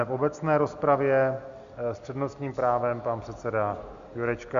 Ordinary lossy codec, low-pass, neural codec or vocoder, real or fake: Opus, 64 kbps; 7.2 kHz; none; real